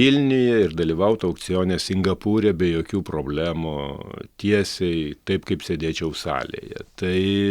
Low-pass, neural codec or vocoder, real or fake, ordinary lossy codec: 19.8 kHz; none; real; Opus, 64 kbps